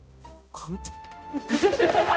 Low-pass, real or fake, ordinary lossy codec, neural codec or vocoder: none; fake; none; codec, 16 kHz, 0.5 kbps, X-Codec, HuBERT features, trained on balanced general audio